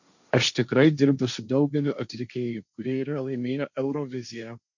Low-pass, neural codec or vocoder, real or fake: 7.2 kHz; codec, 16 kHz, 1.1 kbps, Voila-Tokenizer; fake